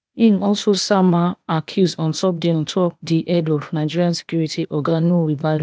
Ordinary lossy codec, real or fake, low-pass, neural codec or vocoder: none; fake; none; codec, 16 kHz, 0.8 kbps, ZipCodec